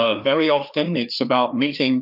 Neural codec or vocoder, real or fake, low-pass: codec, 16 kHz, 2 kbps, FreqCodec, larger model; fake; 5.4 kHz